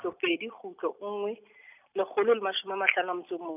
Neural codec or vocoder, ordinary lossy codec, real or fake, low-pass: none; none; real; 3.6 kHz